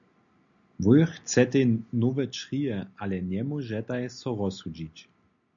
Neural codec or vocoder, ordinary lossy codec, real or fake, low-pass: none; MP3, 64 kbps; real; 7.2 kHz